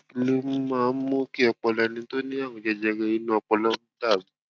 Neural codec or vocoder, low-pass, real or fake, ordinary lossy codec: none; none; real; none